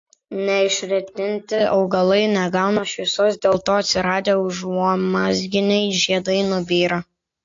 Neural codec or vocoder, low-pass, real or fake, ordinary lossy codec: none; 7.2 kHz; real; AAC, 48 kbps